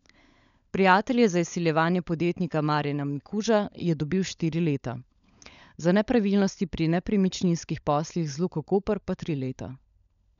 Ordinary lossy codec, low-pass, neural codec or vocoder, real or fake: none; 7.2 kHz; codec, 16 kHz, 16 kbps, FunCodec, trained on LibriTTS, 50 frames a second; fake